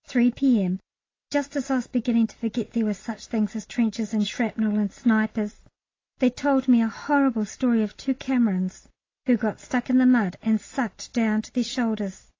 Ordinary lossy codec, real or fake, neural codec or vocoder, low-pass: AAC, 32 kbps; real; none; 7.2 kHz